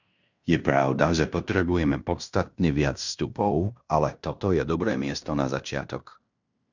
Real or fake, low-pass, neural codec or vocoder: fake; 7.2 kHz; codec, 16 kHz in and 24 kHz out, 0.9 kbps, LongCat-Audio-Codec, fine tuned four codebook decoder